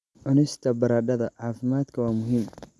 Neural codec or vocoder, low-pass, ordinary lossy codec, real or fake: none; none; none; real